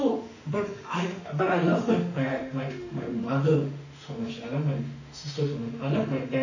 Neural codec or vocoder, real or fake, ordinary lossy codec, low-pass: autoencoder, 48 kHz, 32 numbers a frame, DAC-VAE, trained on Japanese speech; fake; none; 7.2 kHz